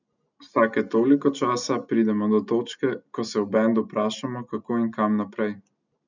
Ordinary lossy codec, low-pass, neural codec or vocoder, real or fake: none; 7.2 kHz; none; real